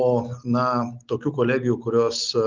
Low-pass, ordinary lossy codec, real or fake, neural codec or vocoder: 7.2 kHz; Opus, 32 kbps; real; none